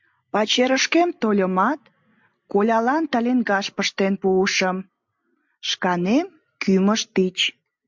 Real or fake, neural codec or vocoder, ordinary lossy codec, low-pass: real; none; MP3, 64 kbps; 7.2 kHz